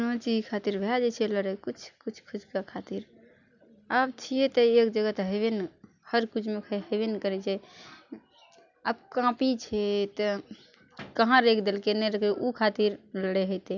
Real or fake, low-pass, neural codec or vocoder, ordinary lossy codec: real; 7.2 kHz; none; none